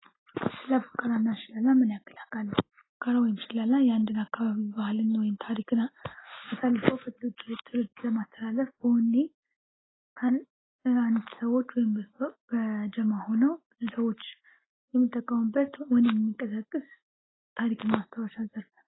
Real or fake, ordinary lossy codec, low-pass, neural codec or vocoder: real; AAC, 16 kbps; 7.2 kHz; none